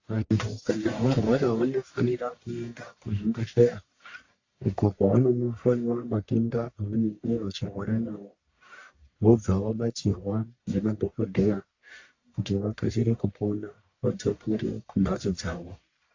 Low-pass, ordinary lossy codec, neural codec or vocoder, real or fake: 7.2 kHz; AAC, 48 kbps; codec, 44.1 kHz, 1.7 kbps, Pupu-Codec; fake